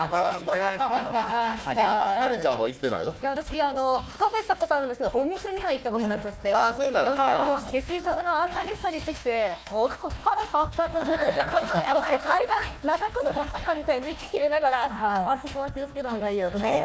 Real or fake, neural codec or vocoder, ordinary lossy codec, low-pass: fake; codec, 16 kHz, 1 kbps, FunCodec, trained on Chinese and English, 50 frames a second; none; none